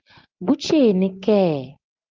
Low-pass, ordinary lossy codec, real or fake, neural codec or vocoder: 7.2 kHz; Opus, 24 kbps; real; none